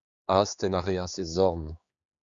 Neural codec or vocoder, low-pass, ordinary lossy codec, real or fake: codec, 16 kHz, 4 kbps, X-Codec, HuBERT features, trained on general audio; 7.2 kHz; Opus, 64 kbps; fake